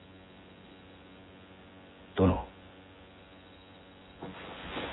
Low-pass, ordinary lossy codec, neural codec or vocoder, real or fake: 7.2 kHz; AAC, 16 kbps; vocoder, 24 kHz, 100 mel bands, Vocos; fake